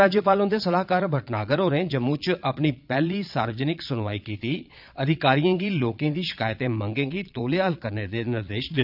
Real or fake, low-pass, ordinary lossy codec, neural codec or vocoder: fake; 5.4 kHz; none; vocoder, 22.05 kHz, 80 mel bands, Vocos